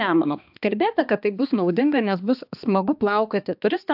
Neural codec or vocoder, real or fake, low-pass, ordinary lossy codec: codec, 16 kHz, 2 kbps, X-Codec, HuBERT features, trained on balanced general audio; fake; 5.4 kHz; AAC, 48 kbps